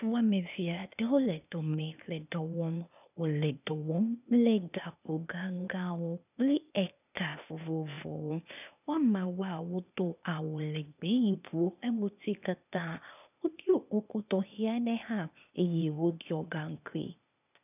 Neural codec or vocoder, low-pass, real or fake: codec, 16 kHz, 0.8 kbps, ZipCodec; 3.6 kHz; fake